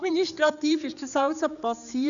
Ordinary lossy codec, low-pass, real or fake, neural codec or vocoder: none; 7.2 kHz; fake; codec, 16 kHz, 4 kbps, X-Codec, HuBERT features, trained on general audio